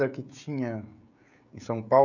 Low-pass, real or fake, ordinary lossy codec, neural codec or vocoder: 7.2 kHz; fake; none; codec, 16 kHz, 16 kbps, FreqCodec, smaller model